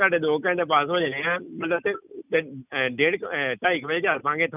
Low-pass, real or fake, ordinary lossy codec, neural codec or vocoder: 3.6 kHz; real; none; none